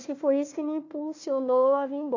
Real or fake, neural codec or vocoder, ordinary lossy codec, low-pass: fake; codec, 16 kHz, 1 kbps, FunCodec, trained on Chinese and English, 50 frames a second; AAC, 48 kbps; 7.2 kHz